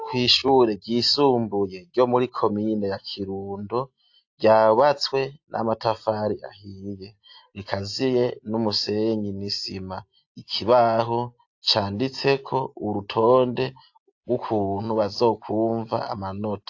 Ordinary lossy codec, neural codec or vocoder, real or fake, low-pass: AAC, 48 kbps; none; real; 7.2 kHz